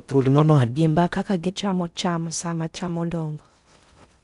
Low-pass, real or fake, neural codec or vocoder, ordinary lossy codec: 10.8 kHz; fake; codec, 16 kHz in and 24 kHz out, 0.6 kbps, FocalCodec, streaming, 4096 codes; none